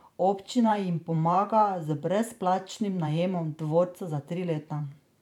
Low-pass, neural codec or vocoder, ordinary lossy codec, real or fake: 19.8 kHz; vocoder, 44.1 kHz, 128 mel bands every 512 samples, BigVGAN v2; none; fake